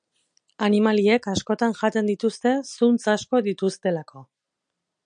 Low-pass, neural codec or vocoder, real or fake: 9.9 kHz; none; real